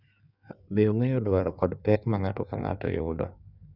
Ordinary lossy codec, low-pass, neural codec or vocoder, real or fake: none; 5.4 kHz; codec, 16 kHz in and 24 kHz out, 1.1 kbps, FireRedTTS-2 codec; fake